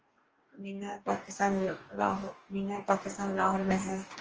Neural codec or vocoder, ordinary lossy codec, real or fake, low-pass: codec, 44.1 kHz, 2.6 kbps, DAC; Opus, 24 kbps; fake; 7.2 kHz